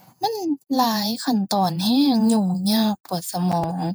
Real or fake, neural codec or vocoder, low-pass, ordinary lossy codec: fake; vocoder, 48 kHz, 128 mel bands, Vocos; none; none